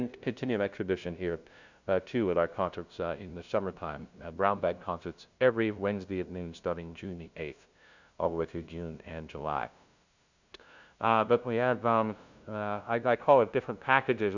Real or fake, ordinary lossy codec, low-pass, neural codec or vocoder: fake; Opus, 64 kbps; 7.2 kHz; codec, 16 kHz, 0.5 kbps, FunCodec, trained on LibriTTS, 25 frames a second